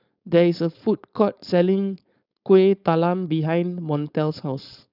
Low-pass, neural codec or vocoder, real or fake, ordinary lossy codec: 5.4 kHz; codec, 16 kHz, 4.8 kbps, FACodec; fake; none